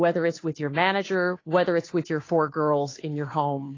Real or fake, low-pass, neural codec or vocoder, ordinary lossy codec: fake; 7.2 kHz; codec, 16 kHz, 2 kbps, FunCodec, trained on Chinese and English, 25 frames a second; AAC, 32 kbps